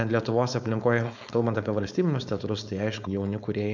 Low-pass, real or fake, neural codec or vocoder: 7.2 kHz; fake; codec, 16 kHz, 4.8 kbps, FACodec